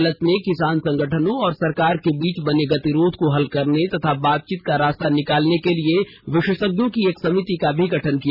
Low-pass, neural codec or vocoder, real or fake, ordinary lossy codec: 5.4 kHz; none; real; none